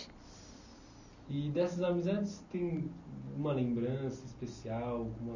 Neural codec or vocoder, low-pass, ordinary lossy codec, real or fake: none; 7.2 kHz; none; real